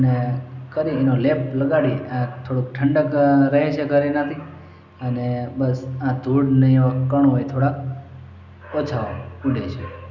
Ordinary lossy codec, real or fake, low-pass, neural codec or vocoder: none; real; 7.2 kHz; none